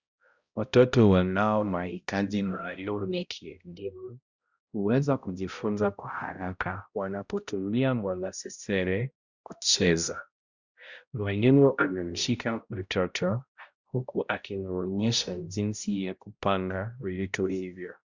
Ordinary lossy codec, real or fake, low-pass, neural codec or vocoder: Opus, 64 kbps; fake; 7.2 kHz; codec, 16 kHz, 0.5 kbps, X-Codec, HuBERT features, trained on balanced general audio